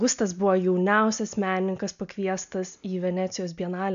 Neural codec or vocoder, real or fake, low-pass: none; real; 7.2 kHz